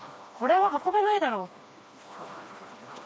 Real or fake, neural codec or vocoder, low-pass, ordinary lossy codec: fake; codec, 16 kHz, 2 kbps, FreqCodec, smaller model; none; none